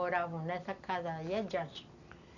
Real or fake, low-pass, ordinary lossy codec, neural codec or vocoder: real; 7.2 kHz; none; none